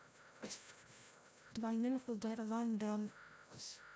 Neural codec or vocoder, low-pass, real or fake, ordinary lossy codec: codec, 16 kHz, 0.5 kbps, FreqCodec, larger model; none; fake; none